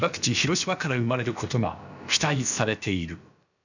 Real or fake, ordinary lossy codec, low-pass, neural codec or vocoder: fake; none; 7.2 kHz; codec, 16 kHz, about 1 kbps, DyCAST, with the encoder's durations